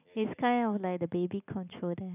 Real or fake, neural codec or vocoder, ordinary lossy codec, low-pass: real; none; none; 3.6 kHz